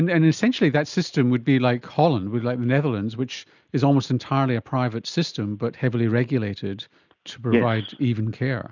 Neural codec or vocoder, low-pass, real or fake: none; 7.2 kHz; real